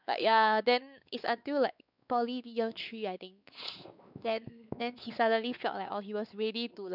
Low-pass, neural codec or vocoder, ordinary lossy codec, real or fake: 5.4 kHz; codec, 16 kHz, 4 kbps, X-Codec, WavLM features, trained on Multilingual LibriSpeech; none; fake